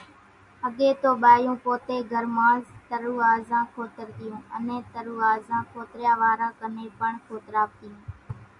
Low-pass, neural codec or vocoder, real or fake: 10.8 kHz; none; real